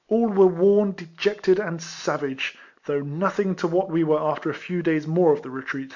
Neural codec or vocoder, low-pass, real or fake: none; 7.2 kHz; real